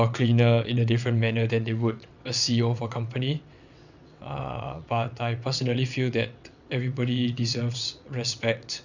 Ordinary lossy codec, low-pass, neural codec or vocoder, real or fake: none; 7.2 kHz; vocoder, 22.05 kHz, 80 mel bands, WaveNeXt; fake